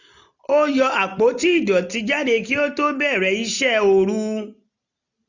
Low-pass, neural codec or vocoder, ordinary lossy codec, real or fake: 7.2 kHz; none; none; real